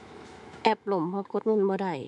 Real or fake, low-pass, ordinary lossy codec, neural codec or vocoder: fake; 10.8 kHz; none; autoencoder, 48 kHz, 128 numbers a frame, DAC-VAE, trained on Japanese speech